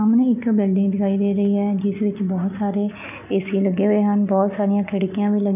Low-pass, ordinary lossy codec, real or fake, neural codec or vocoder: 3.6 kHz; none; real; none